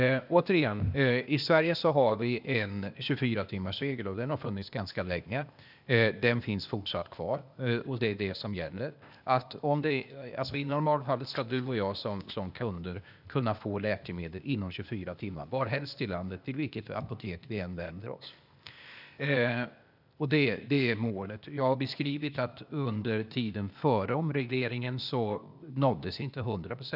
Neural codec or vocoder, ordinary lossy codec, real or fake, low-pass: codec, 16 kHz, 0.8 kbps, ZipCodec; none; fake; 5.4 kHz